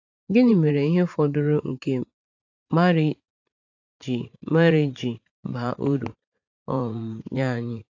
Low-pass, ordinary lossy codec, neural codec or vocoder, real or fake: 7.2 kHz; AAC, 48 kbps; vocoder, 44.1 kHz, 80 mel bands, Vocos; fake